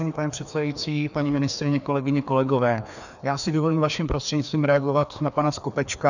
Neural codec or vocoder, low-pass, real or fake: codec, 16 kHz, 2 kbps, FreqCodec, larger model; 7.2 kHz; fake